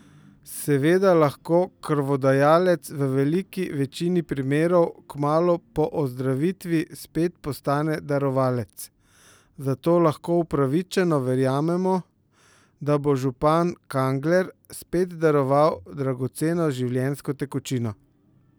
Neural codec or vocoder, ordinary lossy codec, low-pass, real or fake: none; none; none; real